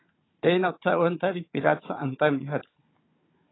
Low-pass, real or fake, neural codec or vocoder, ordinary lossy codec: 7.2 kHz; fake; codec, 16 kHz, 16 kbps, FunCodec, trained on Chinese and English, 50 frames a second; AAC, 16 kbps